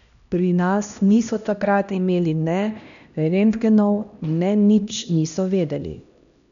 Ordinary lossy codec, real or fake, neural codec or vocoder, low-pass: none; fake; codec, 16 kHz, 1 kbps, X-Codec, HuBERT features, trained on LibriSpeech; 7.2 kHz